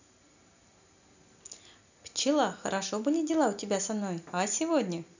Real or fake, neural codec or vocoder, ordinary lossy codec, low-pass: real; none; none; 7.2 kHz